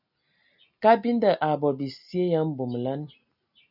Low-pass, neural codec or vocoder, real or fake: 5.4 kHz; none; real